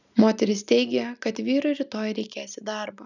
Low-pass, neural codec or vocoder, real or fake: 7.2 kHz; none; real